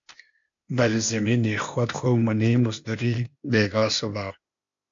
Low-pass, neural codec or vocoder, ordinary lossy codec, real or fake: 7.2 kHz; codec, 16 kHz, 0.8 kbps, ZipCodec; MP3, 48 kbps; fake